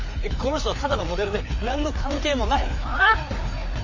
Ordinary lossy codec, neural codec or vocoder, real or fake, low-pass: MP3, 32 kbps; codec, 16 kHz, 4 kbps, FreqCodec, larger model; fake; 7.2 kHz